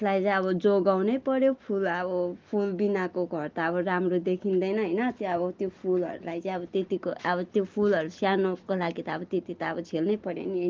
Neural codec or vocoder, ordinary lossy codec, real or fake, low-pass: none; Opus, 32 kbps; real; 7.2 kHz